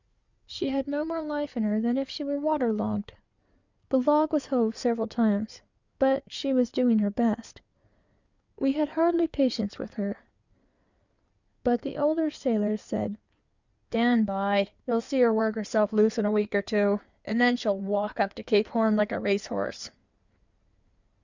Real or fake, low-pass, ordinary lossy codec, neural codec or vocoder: fake; 7.2 kHz; Opus, 64 kbps; codec, 16 kHz in and 24 kHz out, 2.2 kbps, FireRedTTS-2 codec